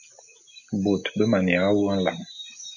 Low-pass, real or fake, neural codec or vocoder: 7.2 kHz; real; none